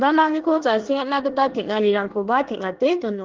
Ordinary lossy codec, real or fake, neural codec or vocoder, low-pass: Opus, 16 kbps; fake; codec, 24 kHz, 1 kbps, SNAC; 7.2 kHz